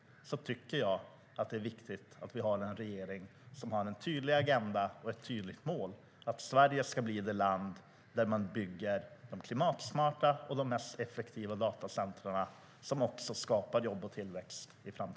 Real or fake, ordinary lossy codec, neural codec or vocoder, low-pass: real; none; none; none